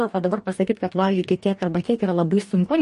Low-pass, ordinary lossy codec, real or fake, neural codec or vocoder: 14.4 kHz; MP3, 48 kbps; fake; codec, 44.1 kHz, 2.6 kbps, DAC